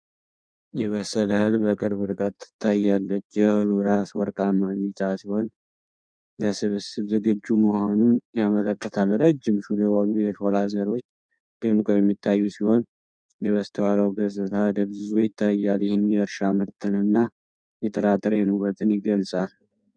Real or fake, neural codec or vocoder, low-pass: fake; codec, 16 kHz in and 24 kHz out, 1.1 kbps, FireRedTTS-2 codec; 9.9 kHz